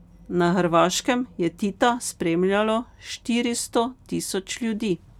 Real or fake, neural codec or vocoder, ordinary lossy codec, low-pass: real; none; none; 19.8 kHz